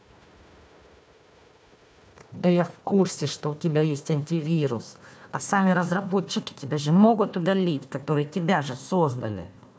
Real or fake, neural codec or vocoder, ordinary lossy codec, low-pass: fake; codec, 16 kHz, 1 kbps, FunCodec, trained on Chinese and English, 50 frames a second; none; none